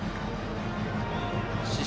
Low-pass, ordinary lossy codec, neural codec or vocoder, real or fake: none; none; none; real